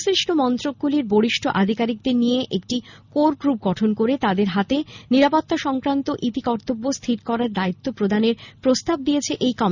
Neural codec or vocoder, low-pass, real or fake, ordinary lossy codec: none; 7.2 kHz; real; none